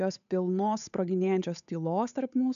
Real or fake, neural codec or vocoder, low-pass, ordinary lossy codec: fake; codec, 16 kHz, 8 kbps, FunCodec, trained on Chinese and English, 25 frames a second; 7.2 kHz; AAC, 96 kbps